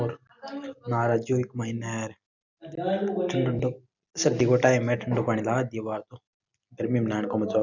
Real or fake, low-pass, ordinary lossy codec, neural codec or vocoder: real; 7.2 kHz; none; none